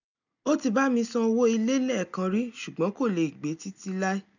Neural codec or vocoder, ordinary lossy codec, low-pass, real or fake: none; none; 7.2 kHz; real